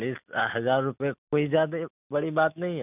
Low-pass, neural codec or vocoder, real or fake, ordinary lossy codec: 3.6 kHz; none; real; none